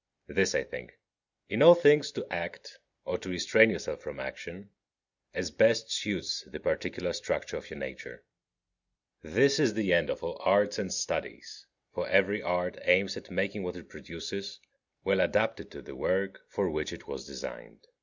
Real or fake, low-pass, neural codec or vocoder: real; 7.2 kHz; none